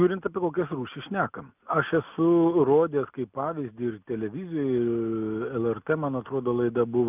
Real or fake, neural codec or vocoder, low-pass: real; none; 3.6 kHz